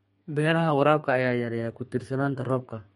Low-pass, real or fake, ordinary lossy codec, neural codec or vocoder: 14.4 kHz; fake; MP3, 48 kbps; codec, 32 kHz, 1.9 kbps, SNAC